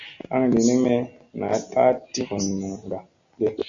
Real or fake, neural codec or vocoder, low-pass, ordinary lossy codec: real; none; 7.2 kHz; Opus, 64 kbps